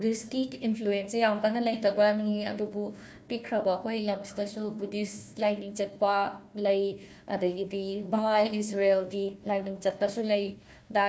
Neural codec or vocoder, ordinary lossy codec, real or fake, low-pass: codec, 16 kHz, 1 kbps, FunCodec, trained on Chinese and English, 50 frames a second; none; fake; none